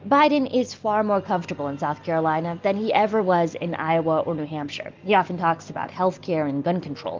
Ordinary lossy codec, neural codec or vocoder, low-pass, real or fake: Opus, 32 kbps; none; 7.2 kHz; real